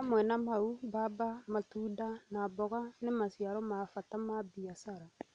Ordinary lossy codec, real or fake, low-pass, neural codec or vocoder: none; real; 9.9 kHz; none